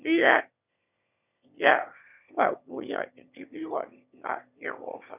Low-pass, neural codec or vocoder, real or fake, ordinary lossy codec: 3.6 kHz; autoencoder, 22.05 kHz, a latent of 192 numbers a frame, VITS, trained on one speaker; fake; none